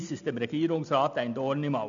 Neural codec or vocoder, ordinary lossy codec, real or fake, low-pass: none; none; real; 7.2 kHz